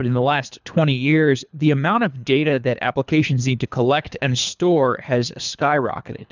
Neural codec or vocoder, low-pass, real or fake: codec, 24 kHz, 3 kbps, HILCodec; 7.2 kHz; fake